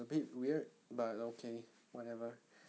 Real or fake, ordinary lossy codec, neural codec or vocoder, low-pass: real; none; none; none